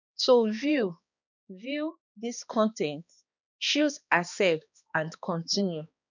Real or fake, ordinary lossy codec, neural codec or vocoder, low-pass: fake; none; codec, 16 kHz, 2 kbps, X-Codec, HuBERT features, trained on balanced general audio; 7.2 kHz